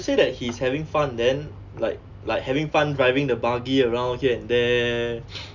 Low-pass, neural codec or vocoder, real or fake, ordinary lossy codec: 7.2 kHz; none; real; none